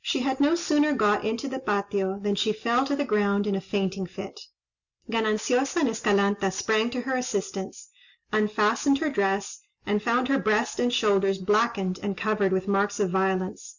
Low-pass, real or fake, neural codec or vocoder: 7.2 kHz; real; none